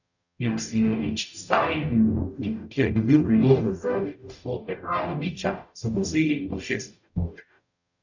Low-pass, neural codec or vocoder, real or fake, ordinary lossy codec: 7.2 kHz; codec, 44.1 kHz, 0.9 kbps, DAC; fake; none